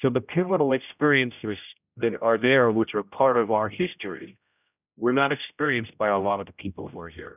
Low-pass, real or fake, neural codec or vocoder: 3.6 kHz; fake; codec, 16 kHz, 0.5 kbps, X-Codec, HuBERT features, trained on general audio